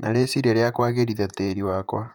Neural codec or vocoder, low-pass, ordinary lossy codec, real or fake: vocoder, 44.1 kHz, 128 mel bands, Pupu-Vocoder; 19.8 kHz; none; fake